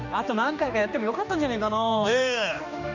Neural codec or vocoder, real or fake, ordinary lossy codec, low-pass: codec, 16 kHz, 2 kbps, X-Codec, HuBERT features, trained on general audio; fake; none; 7.2 kHz